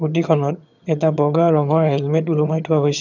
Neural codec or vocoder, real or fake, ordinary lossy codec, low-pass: vocoder, 22.05 kHz, 80 mel bands, HiFi-GAN; fake; none; 7.2 kHz